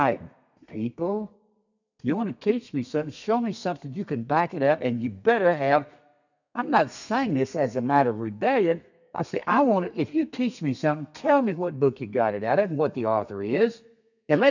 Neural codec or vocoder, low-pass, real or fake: codec, 32 kHz, 1.9 kbps, SNAC; 7.2 kHz; fake